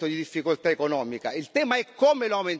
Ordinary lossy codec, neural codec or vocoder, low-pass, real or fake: none; none; none; real